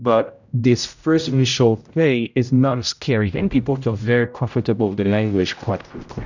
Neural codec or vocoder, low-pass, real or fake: codec, 16 kHz, 0.5 kbps, X-Codec, HuBERT features, trained on general audio; 7.2 kHz; fake